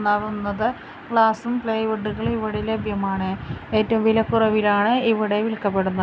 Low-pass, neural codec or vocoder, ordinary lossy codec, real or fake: none; none; none; real